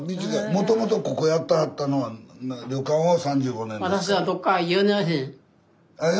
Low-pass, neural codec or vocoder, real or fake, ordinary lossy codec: none; none; real; none